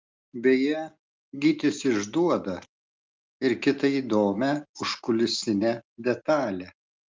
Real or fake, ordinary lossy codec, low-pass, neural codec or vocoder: real; Opus, 24 kbps; 7.2 kHz; none